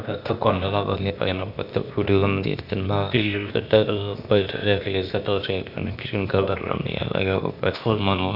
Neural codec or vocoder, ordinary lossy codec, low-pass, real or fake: codec, 16 kHz, 0.8 kbps, ZipCodec; none; 5.4 kHz; fake